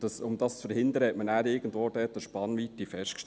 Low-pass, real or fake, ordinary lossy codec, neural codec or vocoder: none; real; none; none